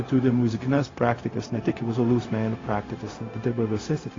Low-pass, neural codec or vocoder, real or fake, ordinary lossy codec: 7.2 kHz; codec, 16 kHz, 0.4 kbps, LongCat-Audio-Codec; fake; AAC, 32 kbps